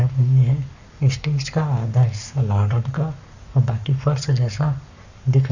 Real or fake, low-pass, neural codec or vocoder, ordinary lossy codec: fake; 7.2 kHz; codec, 44.1 kHz, 7.8 kbps, Pupu-Codec; none